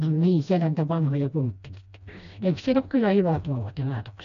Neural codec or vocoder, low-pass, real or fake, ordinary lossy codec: codec, 16 kHz, 1 kbps, FreqCodec, smaller model; 7.2 kHz; fake; none